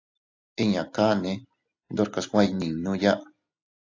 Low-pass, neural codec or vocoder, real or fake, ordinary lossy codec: 7.2 kHz; none; real; AAC, 48 kbps